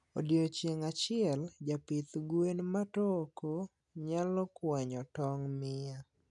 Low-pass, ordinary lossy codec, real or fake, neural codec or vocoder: 10.8 kHz; none; real; none